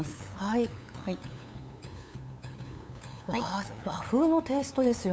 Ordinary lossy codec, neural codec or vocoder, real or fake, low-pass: none; codec, 16 kHz, 8 kbps, FunCodec, trained on LibriTTS, 25 frames a second; fake; none